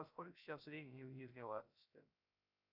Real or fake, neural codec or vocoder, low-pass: fake; codec, 16 kHz, 0.3 kbps, FocalCodec; 5.4 kHz